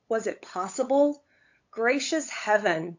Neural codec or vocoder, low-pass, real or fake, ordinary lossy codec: codec, 16 kHz, 8 kbps, FunCodec, trained on LibriTTS, 25 frames a second; 7.2 kHz; fake; AAC, 48 kbps